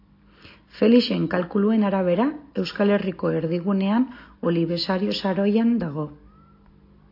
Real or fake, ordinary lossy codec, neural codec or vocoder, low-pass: real; AAC, 32 kbps; none; 5.4 kHz